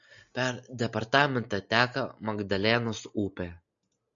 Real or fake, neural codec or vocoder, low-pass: real; none; 7.2 kHz